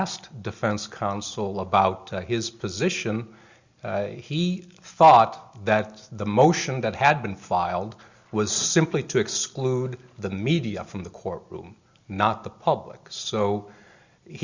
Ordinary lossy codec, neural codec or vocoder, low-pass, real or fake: Opus, 64 kbps; none; 7.2 kHz; real